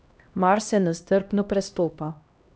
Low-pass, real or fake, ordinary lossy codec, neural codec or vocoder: none; fake; none; codec, 16 kHz, 1 kbps, X-Codec, HuBERT features, trained on LibriSpeech